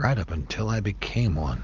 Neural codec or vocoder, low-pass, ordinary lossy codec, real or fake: none; 7.2 kHz; Opus, 32 kbps; real